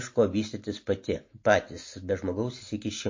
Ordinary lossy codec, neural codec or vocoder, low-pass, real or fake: MP3, 32 kbps; none; 7.2 kHz; real